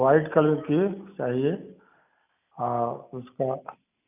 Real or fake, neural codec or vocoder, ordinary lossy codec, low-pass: real; none; none; 3.6 kHz